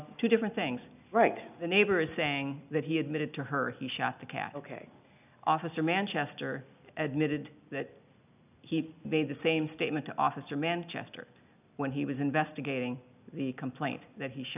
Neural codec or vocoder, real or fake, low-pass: none; real; 3.6 kHz